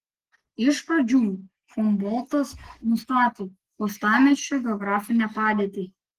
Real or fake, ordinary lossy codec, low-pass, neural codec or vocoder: real; Opus, 16 kbps; 14.4 kHz; none